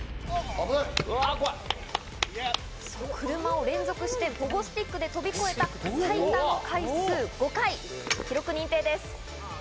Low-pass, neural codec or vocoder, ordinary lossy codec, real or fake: none; none; none; real